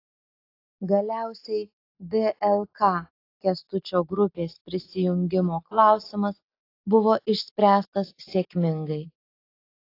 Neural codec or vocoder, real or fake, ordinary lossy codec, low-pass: none; real; AAC, 32 kbps; 5.4 kHz